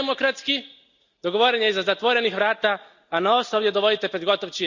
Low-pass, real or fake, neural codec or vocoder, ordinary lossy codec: 7.2 kHz; real; none; Opus, 64 kbps